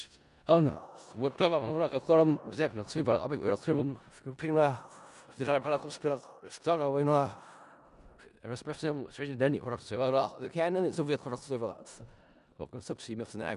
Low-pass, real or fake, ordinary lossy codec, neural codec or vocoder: 10.8 kHz; fake; MP3, 96 kbps; codec, 16 kHz in and 24 kHz out, 0.4 kbps, LongCat-Audio-Codec, four codebook decoder